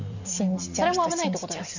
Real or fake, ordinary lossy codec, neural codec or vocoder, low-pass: real; none; none; 7.2 kHz